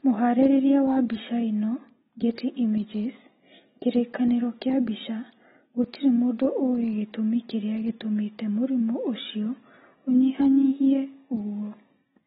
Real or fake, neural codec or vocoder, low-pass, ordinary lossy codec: real; none; 19.8 kHz; AAC, 16 kbps